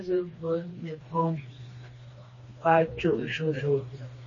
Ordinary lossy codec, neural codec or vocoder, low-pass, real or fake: MP3, 32 kbps; codec, 16 kHz, 2 kbps, FreqCodec, smaller model; 7.2 kHz; fake